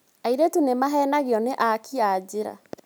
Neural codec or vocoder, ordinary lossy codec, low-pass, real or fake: none; none; none; real